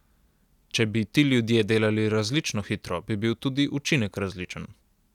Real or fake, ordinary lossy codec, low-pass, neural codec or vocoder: real; none; 19.8 kHz; none